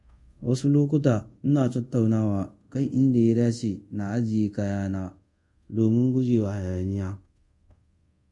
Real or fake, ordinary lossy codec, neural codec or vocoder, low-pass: fake; MP3, 48 kbps; codec, 24 kHz, 0.5 kbps, DualCodec; 10.8 kHz